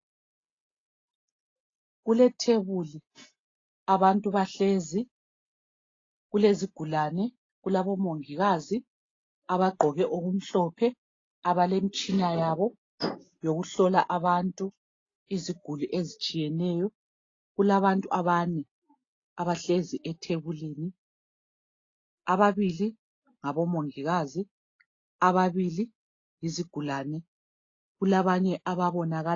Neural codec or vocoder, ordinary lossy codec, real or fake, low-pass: none; AAC, 32 kbps; real; 7.2 kHz